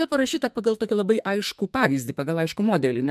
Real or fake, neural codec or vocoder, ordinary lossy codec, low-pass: fake; codec, 44.1 kHz, 2.6 kbps, SNAC; MP3, 96 kbps; 14.4 kHz